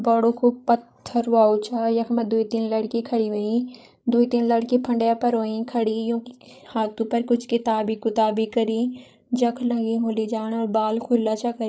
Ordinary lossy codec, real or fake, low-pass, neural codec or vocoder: none; fake; none; codec, 16 kHz, 16 kbps, FreqCodec, larger model